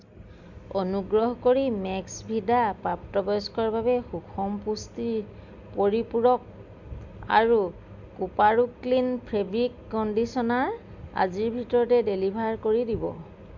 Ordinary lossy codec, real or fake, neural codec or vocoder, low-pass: none; real; none; 7.2 kHz